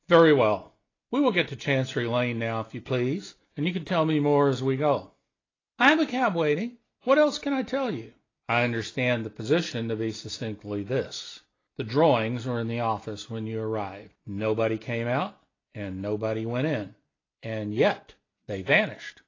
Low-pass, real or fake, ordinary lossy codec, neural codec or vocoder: 7.2 kHz; real; AAC, 32 kbps; none